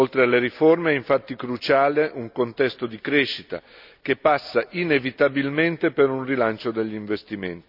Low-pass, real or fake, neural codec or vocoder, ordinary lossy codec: 5.4 kHz; real; none; none